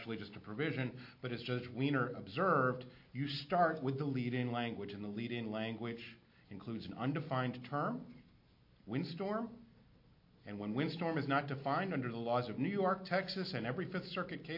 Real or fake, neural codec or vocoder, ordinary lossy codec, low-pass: real; none; MP3, 32 kbps; 5.4 kHz